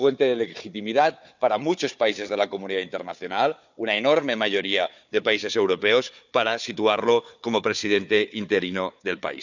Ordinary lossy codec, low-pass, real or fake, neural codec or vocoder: none; 7.2 kHz; fake; codec, 16 kHz, 4 kbps, FunCodec, trained on Chinese and English, 50 frames a second